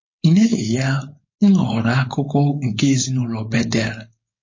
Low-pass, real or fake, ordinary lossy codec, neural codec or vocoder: 7.2 kHz; fake; MP3, 32 kbps; codec, 16 kHz, 4.8 kbps, FACodec